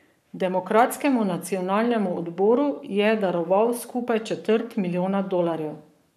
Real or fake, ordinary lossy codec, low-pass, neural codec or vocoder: fake; none; 14.4 kHz; codec, 44.1 kHz, 7.8 kbps, Pupu-Codec